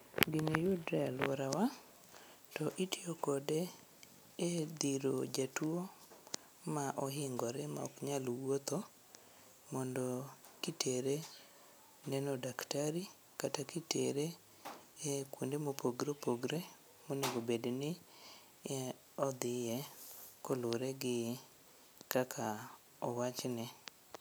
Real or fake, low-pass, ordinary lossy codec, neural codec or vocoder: real; none; none; none